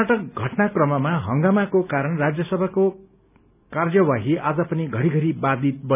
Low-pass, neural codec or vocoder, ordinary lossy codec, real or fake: 3.6 kHz; none; none; real